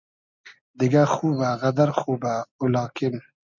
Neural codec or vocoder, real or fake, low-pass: none; real; 7.2 kHz